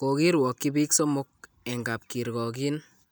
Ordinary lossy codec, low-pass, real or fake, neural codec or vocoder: none; none; real; none